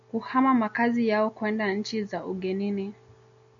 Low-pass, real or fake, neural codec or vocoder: 7.2 kHz; real; none